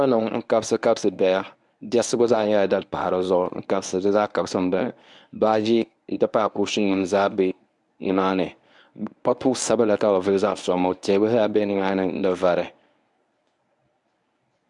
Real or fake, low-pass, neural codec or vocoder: fake; 10.8 kHz; codec, 24 kHz, 0.9 kbps, WavTokenizer, medium speech release version 1